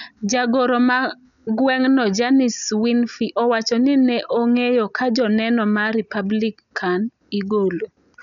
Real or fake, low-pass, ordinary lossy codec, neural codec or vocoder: real; 7.2 kHz; none; none